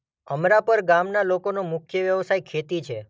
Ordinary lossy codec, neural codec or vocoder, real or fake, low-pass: none; none; real; none